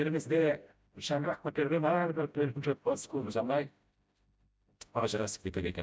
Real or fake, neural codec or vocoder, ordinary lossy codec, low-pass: fake; codec, 16 kHz, 0.5 kbps, FreqCodec, smaller model; none; none